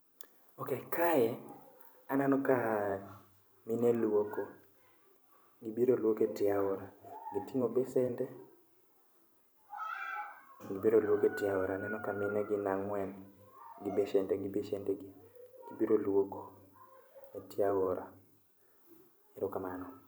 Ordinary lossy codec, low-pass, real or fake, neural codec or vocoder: none; none; fake; vocoder, 44.1 kHz, 128 mel bands every 512 samples, BigVGAN v2